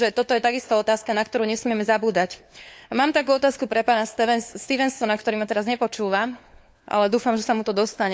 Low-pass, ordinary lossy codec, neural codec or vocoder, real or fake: none; none; codec, 16 kHz, 4 kbps, FunCodec, trained on LibriTTS, 50 frames a second; fake